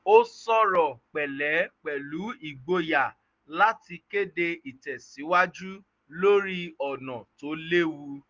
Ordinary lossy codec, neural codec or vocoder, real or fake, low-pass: Opus, 32 kbps; none; real; 7.2 kHz